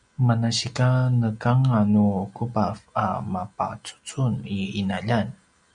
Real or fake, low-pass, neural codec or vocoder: real; 9.9 kHz; none